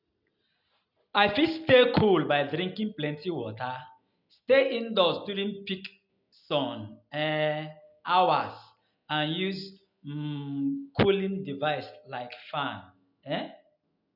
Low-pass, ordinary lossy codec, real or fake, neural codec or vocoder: 5.4 kHz; none; real; none